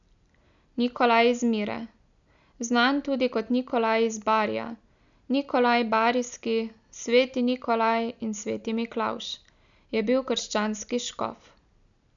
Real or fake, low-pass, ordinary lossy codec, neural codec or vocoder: real; 7.2 kHz; none; none